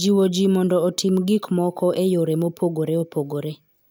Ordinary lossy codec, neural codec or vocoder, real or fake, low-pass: none; none; real; none